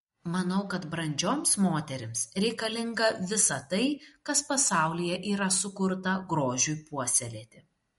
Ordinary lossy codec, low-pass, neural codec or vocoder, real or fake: MP3, 48 kbps; 19.8 kHz; none; real